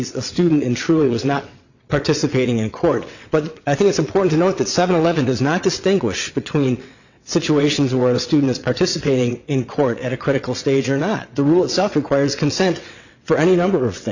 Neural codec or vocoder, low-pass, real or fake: vocoder, 22.05 kHz, 80 mel bands, WaveNeXt; 7.2 kHz; fake